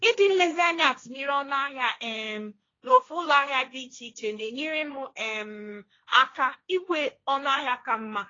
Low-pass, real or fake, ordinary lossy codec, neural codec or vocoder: 7.2 kHz; fake; AAC, 32 kbps; codec, 16 kHz, 1.1 kbps, Voila-Tokenizer